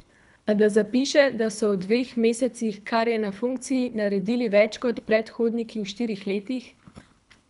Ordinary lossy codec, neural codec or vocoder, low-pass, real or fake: none; codec, 24 kHz, 3 kbps, HILCodec; 10.8 kHz; fake